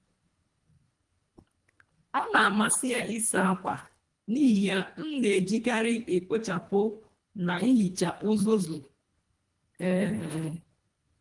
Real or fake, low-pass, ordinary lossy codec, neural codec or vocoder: fake; 10.8 kHz; Opus, 32 kbps; codec, 24 kHz, 1.5 kbps, HILCodec